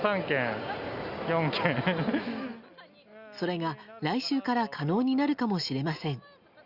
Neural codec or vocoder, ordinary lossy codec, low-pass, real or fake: none; Opus, 64 kbps; 5.4 kHz; real